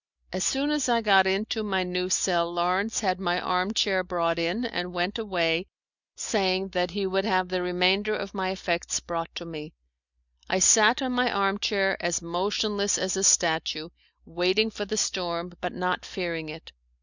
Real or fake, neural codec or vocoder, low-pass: real; none; 7.2 kHz